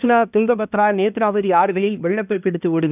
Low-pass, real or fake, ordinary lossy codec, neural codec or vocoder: 3.6 kHz; fake; none; codec, 16 kHz, 1 kbps, X-Codec, HuBERT features, trained on balanced general audio